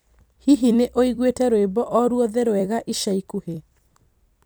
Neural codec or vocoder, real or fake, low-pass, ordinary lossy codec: vocoder, 44.1 kHz, 128 mel bands every 256 samples, BigVGAN v2; fake; none; none